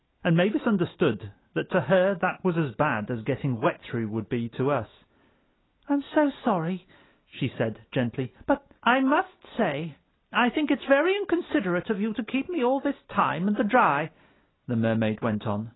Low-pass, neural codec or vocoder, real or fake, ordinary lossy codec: 7.2 kHz; none; real; AAC, 16 kbps